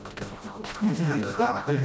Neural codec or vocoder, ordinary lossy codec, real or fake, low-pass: codec, 16 kHz, 0.5 kbps, FreqCodec, smaller model; none; fake; none